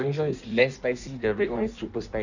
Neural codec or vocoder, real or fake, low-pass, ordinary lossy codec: codec, 16 kHz in and 24 kHz out, 1.1 kbps, FireRedTTS-2 codec; fake; 7.2 kHz; none